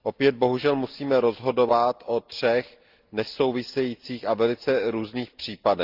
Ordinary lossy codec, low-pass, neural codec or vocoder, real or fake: Opus, 32 kbps; 5.4 kHz; none; real